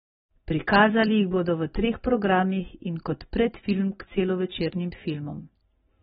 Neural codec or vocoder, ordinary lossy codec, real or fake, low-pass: none; AAC, 16 kbps; real; 7.2 kHz